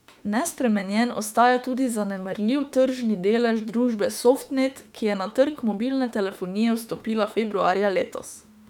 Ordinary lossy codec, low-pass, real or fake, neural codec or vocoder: none; 19.8 kHz; fake; autoencoder, 48 kHz, 32 numbers a frame, DAC-VAE, trained on Japanese speech